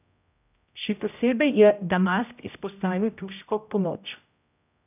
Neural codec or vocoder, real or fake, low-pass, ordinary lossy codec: codec, 16 kHz, 0.5 kbps, X-Codec, HuBERT features, trained on general audio; fake; 3.6 kHz; none